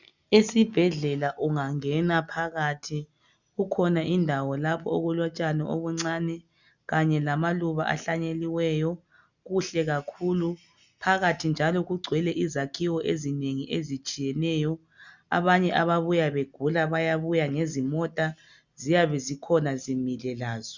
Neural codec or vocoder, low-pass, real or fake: none; 7.2 kHz; real